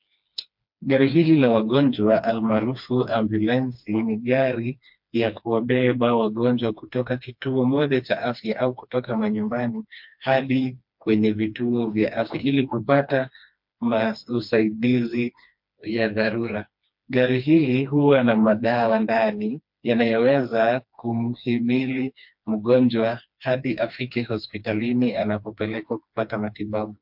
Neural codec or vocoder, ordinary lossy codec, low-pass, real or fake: codec, 16 kHz, 2 kbps, FreqCodec, smaller model; MP3, 48 kbps; 5.4 kHz; fake